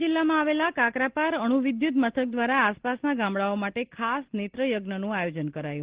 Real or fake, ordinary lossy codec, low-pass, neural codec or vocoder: real; Opus, 16 kbps; 3.6 kHz; none